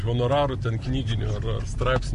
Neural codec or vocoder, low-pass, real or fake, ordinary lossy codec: none; 10.8 kHz; real; AAC, 64 kbps